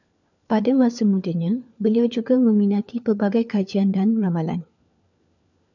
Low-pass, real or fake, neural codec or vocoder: 7.2 kHz; fake; codec, 16 kHz, 4 kbps, FunCodec, trained on LibriTTS, 50 frames a second